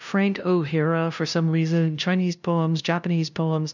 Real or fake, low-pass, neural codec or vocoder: fake; 7.2 kHz; codec, 16 kHz, 0.5 kbps, FunCodec, trained on LibriTTS, 25 frames a second